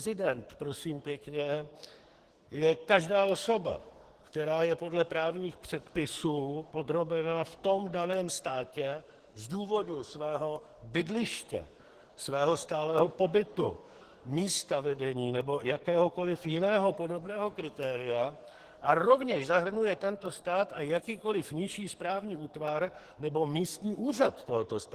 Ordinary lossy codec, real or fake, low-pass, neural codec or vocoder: Opus, 16 kbps; fake; 14.4 kHz; codec, 44.1 kHz, 2.6 kbps, SNAC